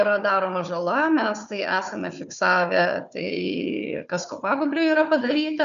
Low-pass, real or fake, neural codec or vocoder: 7.2 kHz; fake; codec, 16 kHz, 4 kbps, FunCodec, trained on Chinese and English, 50 frames a second